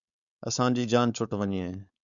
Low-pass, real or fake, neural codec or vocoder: 7.2 kHz; fake; codec, 16 kHz, 4.8 kbps, FACodec